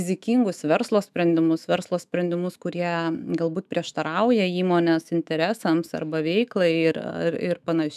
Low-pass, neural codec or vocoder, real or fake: 14.4 kHz; autoencoder, 48 kHz, 128 numbers a frame, DAC-VAE, trained on Japanese speech; fake